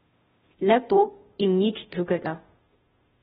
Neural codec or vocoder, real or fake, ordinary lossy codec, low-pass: codec, 16 kHz, 0.5 kbps, FunCodec, trained on Chinese and English, 25 frames a second; fake; AAC, 16 kbps; 7.2 kHz